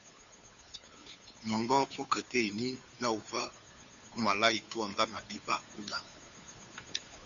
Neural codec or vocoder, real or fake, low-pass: codec, 16 kHz, 2 kbps, FunCodec, trained on Chinese and English, 25 frames a second; fake; 7.2 kHz